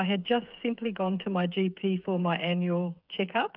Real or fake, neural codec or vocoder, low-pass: fake; codec, 16 kHz, 8 kbps, FreqCodec, smaller model; 5.4 kHz